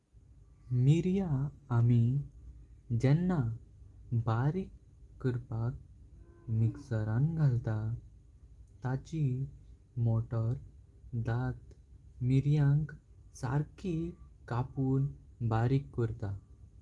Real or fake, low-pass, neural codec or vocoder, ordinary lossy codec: real; 10.8 kHz; none; Opus, 24 kbps